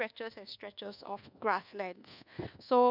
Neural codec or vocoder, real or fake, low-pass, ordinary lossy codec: codec, 24 kHz, 1.2 kbps, DualCodec; fake; 5.4 kHz; none